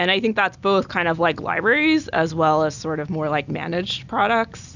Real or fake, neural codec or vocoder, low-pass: real; none; 7.2 kHz